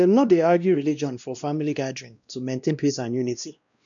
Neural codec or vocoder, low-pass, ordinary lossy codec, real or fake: codec, 16 kHz, 1 kbps, X-Codec, WavLM features, trained on Multilingual LibriSpeech; 7.2 kHz; none; fake